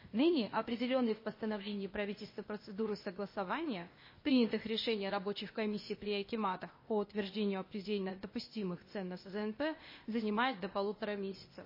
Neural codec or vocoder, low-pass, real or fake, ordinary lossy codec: codec, 16 kHz, 0.8 kbps, ZipCodec; 5.4 kHz; fake; MP3, 24 kbps